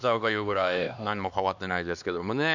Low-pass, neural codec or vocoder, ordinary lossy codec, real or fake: 7.2 kHz; codec, 16 kHz, 2 kbps, X-Codec, HuBERT features, trained on LibriSpeech; none; fake